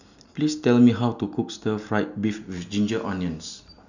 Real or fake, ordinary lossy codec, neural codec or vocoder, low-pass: real; none; none; 7.2 kHz